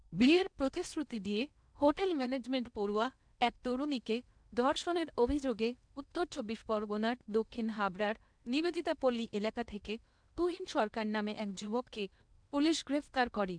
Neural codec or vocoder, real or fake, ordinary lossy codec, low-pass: codec, 16 kHz in and 24 kHz out, 0.8 kbps, FocalCodec, streaming, 65536 codes; fake; none; 9.9 kHz